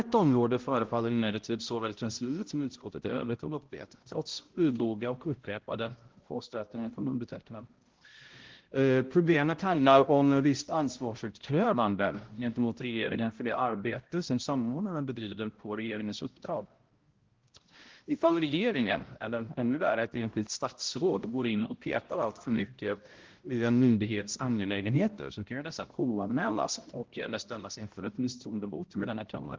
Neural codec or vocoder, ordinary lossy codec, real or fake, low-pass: codec, 16 kHz, 0.5 kbps, X-Codec, HuBERT features, trained on balanced general audio; Opus, 16 kbps; fake; 7.2 kHz